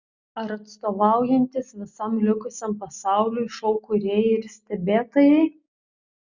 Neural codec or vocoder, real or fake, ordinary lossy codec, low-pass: none; real; Opus, 64 kbps; 7.2 kHz